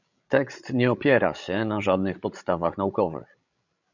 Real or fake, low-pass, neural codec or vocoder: fake; 7.2 kHz; codec, 16 kHz, 16 kbps, FreqCodec, larger model